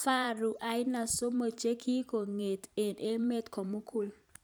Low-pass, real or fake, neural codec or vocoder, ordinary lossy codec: none; fake; vocoder, 44.1 kHz, 128 mel bands every 512 samples, BigVGAN v2; none